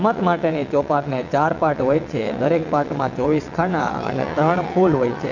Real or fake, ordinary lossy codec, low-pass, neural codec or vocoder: fake; none; 7.2 kHz; vocoder, 22.05 kHz, 80 mel bands, WaveNeXt